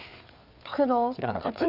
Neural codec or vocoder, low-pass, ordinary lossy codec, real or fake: codec, 16 kHz, 4 kbps, FreqCodec, larger model; 5.4 kHz; none; fake